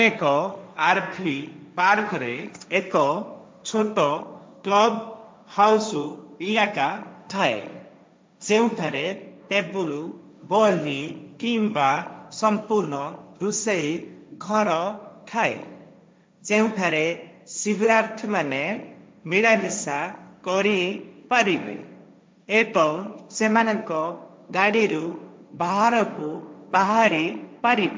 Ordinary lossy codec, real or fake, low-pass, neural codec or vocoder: none; fake; none; codec, 16 kHz, 1.1 kbps, Voila-Tokenizer